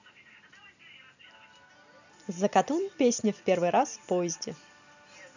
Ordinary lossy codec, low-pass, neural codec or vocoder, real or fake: none; 7.2 kHz; none; real